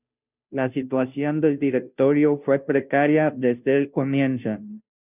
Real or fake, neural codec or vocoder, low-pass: fake; codec, 16 kHz, 0.5 kbps, FunCodec, trained on Chinese and English, 25 frames a second; 3.6 kHz